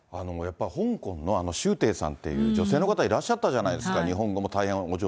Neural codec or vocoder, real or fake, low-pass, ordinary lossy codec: none; real; none; none